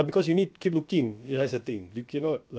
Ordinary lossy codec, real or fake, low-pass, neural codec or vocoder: none; fake; none; codec, 16 kHz, about 1 kbps, DyCAST, with the encoder's durations